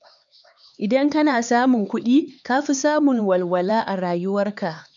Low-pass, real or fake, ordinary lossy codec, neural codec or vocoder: 7.2 kHz; fake; none; codec, 16 kHz, 4 kbps, X-Codec, HuBERT features, trained on LibriSpeech